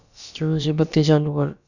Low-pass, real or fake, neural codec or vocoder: 7.2 kHz; fake; codec, 16 kHz, about 1 kbps, DyCAST, with the encoder's durations